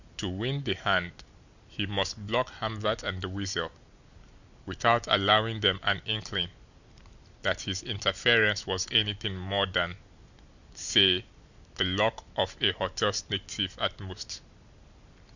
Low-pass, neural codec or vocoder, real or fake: 7.2 kHz; none; real